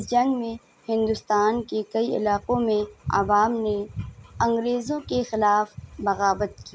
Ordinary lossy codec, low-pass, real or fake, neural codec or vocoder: none; none; real; none